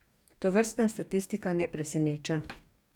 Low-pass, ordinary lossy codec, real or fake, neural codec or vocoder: 19.8 kHz; none; fake; codec, 44.1 kHz, 2.6 kbps, DAC